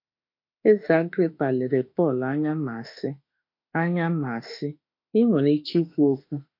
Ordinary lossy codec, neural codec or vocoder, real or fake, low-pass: MP3, 32 kbps; autoencoder, 48 kHz, 32 numbers a frame, DAC-VAE, trained on Japanese speech; fake; 5.4 kHz